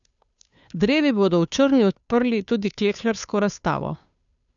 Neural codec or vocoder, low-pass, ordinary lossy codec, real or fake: codec, 16 kHz, 2 kbps, FunCodec, trained on Chinese and English, 25 frames a second; 7.2 kHz; none; fake